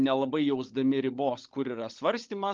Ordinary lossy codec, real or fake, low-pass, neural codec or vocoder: Opus, 32 kbps; fake; 7.2 kHz; codec, 16 kHz, 16 kbps, FunCodec, trained on LibriTTS, 50 frames a second